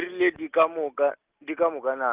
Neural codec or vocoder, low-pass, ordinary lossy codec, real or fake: none; 3.6 kHz; Opus, 32 kbps; real